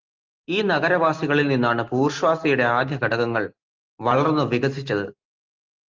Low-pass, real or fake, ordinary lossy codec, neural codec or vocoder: 7.2 kHz; real; Opus, 32 kbps; none